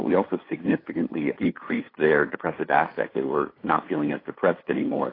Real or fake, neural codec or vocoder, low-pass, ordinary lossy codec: fake; codec, 16 kHz, 8 kbps, FunCodec, trained on LibriTTS, 25 frames a second; 5.4 kHz; AAC, 24 kbps